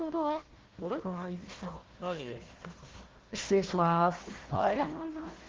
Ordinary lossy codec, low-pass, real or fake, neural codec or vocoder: Opus, 16 kbps; 7.2 kHz; fake; codec, 16 kHz, 1 kbps, FunCodec, trained on Chinese and English, 50 frames a second